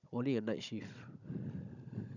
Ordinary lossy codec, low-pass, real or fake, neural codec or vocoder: none; 7.2 kHz; fake; codec, 16 kHz, 16 kbps, FunCodec, trained on Chinese and English, 50 frames a second